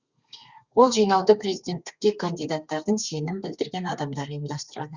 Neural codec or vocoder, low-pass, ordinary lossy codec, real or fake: codec, 32 kHz, 1.9 kbps, SNAC; 7.2 kHz; Opus, 64 kbps; fake